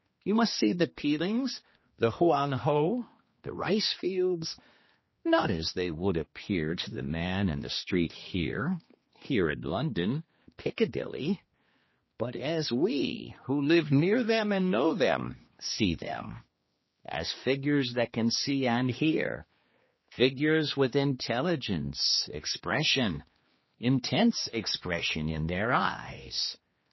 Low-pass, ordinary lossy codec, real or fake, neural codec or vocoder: 7.2 kHz; MP3, 24 kbps; fake; codec, 16 kHz, 2 kbps, X-Codec, HuBERT features, trained on general audio